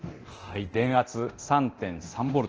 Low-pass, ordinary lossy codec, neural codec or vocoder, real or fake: 7.2 kHz; Opus, 16 kbps; codec, 24 kHz, 0.9 kbps, DualCodec; fake